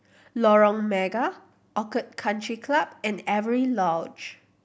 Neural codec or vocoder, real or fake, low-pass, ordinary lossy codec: none; real; none; none